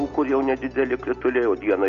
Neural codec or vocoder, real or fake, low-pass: none; real; 7.2 kHz